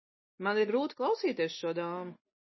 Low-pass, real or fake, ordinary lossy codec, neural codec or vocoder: 7.2 kHz; real; MP3, 24 kbps; none